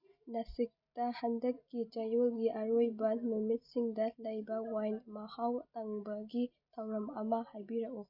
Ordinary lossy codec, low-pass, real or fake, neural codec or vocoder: none; 5.4 kHz; real; none